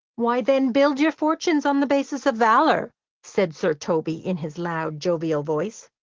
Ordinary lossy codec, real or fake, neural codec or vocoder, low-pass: Opus, 16 kbps; real; none; 7.2 kHz